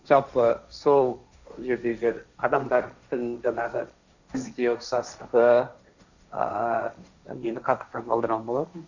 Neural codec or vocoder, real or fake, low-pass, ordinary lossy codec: codec, 16 kHz, 1.1 kbps, Voila-Tokenizer; fake; 7.2 kHz; none